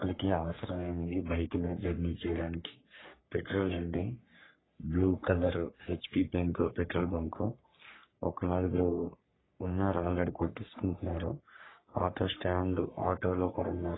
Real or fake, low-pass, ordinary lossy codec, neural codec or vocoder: fake; 7.2 kHz; AAC, 16 kbps; codec, 44.1 kHz, 3.4 kbps, Pupu-Codec